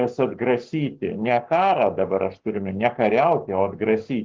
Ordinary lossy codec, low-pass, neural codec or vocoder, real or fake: Opus, 16 kbps; 7.2 kHz; codec, 44.1 kHz, 7.8 kbps, Pupu-Codec; fake